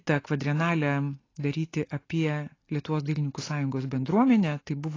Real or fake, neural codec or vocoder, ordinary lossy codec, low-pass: real; none; AAC, 32 kbps; 7.2 kHz